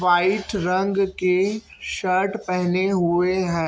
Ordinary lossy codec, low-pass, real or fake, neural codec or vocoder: none; none; real; none